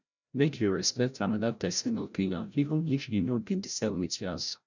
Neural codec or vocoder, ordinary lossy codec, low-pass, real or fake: codec, 16 kHz, 0.5 kbps, FreqCodec, larger model; none; 7.2 kHz; fake